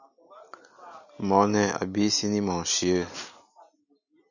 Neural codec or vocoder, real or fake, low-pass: none; real; 7.2 kHz